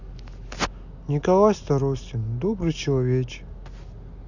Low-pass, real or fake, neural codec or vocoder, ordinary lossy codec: 7.2 kHz; real; none; none